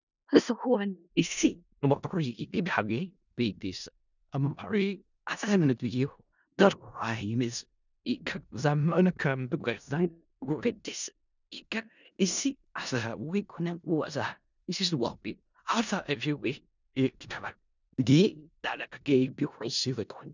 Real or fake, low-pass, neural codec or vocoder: fake; 7.2 kHz; codec, 16 kHz in and 24 kHz out, 0.4 kbps, LongCat-Audio-Codec, four codebook decoder